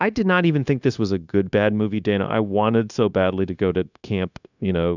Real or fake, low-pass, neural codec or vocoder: fake; 7.2 kHz; codec, 16 kHz, 0.9 kbps, LongCat-Audio-Codec